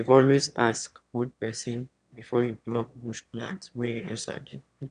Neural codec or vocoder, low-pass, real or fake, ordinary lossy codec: autoencoder, 22.05 kHz, a latent of 192 numbers a frame, VITS, trained on one speaker; 9.9 kHz; fake; none